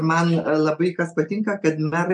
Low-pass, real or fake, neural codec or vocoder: 9.9 kHz; real; none